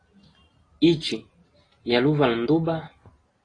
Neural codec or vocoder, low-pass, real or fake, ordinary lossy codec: none; 9.9 kHz; real; AAC, 32 kbps